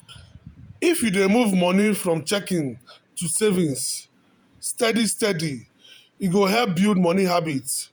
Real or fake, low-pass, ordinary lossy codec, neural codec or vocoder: fake; none; none; vocoder, 48 kHz, 128 mel bands, Vocos